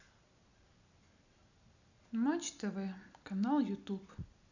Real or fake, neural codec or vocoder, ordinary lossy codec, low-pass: real; none; none; 7.2 kHz